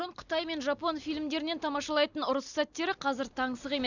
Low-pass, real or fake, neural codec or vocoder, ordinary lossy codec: 7.2 kHz; real; none; none